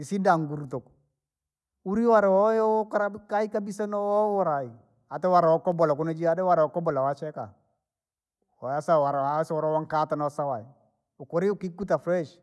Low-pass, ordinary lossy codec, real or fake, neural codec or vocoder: none; none; real; none